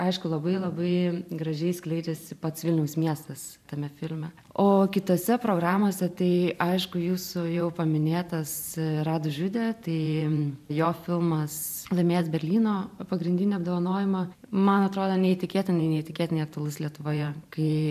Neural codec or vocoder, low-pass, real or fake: vocoder, 44.1 kHz, 128 mel bands every 512 samples, BigVGAN v2; 14.4 kHz; fake